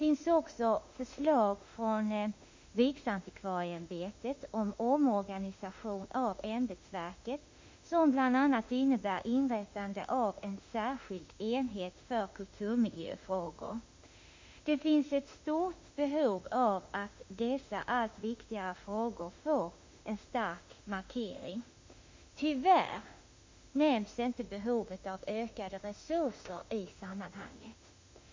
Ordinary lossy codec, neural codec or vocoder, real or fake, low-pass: MP3, 48 kbps; autoencoder, 48 kHz, 32 numbers a frame, DAC-VAE, trained on Japanese speech; fake; 7.2 kHz